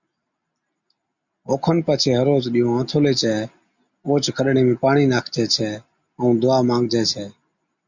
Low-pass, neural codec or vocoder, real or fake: 7.2 kHz; none; real